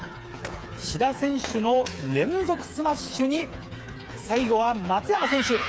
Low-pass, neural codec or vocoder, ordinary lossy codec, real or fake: none; codec, 16 kHz, 4 kbps, FreqCodec, smaller model; none; fake